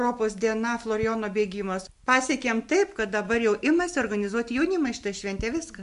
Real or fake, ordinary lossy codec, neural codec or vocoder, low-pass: real; MP3, 64 kbps; none; 10.8 kHz